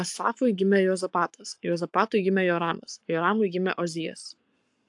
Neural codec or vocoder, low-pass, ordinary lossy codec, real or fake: codec, 44.1 kHz, 7.8 kbps, Pupu-Codec; 10.8 kHz; AAC, 64 kbps; fake